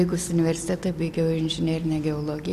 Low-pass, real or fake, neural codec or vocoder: 14.4 kHz; real; none